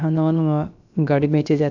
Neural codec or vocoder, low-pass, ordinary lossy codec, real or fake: codec, 16 kHz, about 1 kbps, DyCAST, with the encoder's durations; 7.2 kHz; none; fake